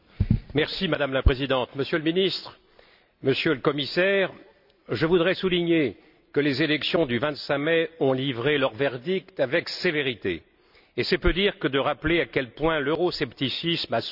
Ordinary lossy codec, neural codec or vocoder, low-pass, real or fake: none; none; 5.4 kHz; real